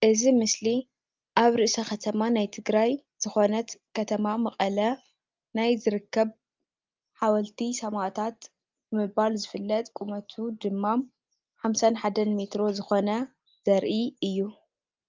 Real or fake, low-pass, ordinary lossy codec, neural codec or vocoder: real; 7.2 kHz; Opus, 24 kbps; none